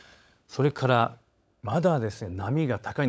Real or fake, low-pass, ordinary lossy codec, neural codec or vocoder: fake; none; none; codec, 16 kHz, 16 kbps, FunCodec, trained on LibriTTS, 50 frames a second